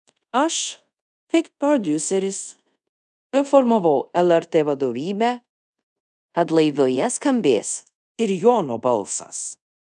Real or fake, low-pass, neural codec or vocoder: fake; 10.8 kHz; codec, 24 kHz, 0.5 kbps, DualCodec